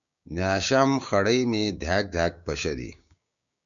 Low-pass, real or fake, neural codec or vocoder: 7.2 kHz; fake; codec, 16 kHz, 6 kbps, DAC